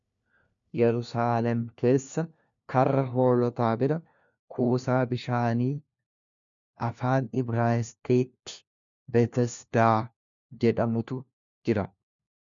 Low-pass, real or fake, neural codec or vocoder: 7.2 kHz; fake; codec, 16 kHz, 1 kbps, FunCodec, trained on LibriTTS, 50 frames a second